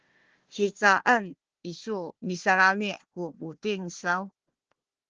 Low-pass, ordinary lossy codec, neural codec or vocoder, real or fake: 7.2 kHz; Opus, 16 kbps; codec, 16 kHz, 1 kbps, FunCodec, trained on Chinese and English, 50 frames a second; fake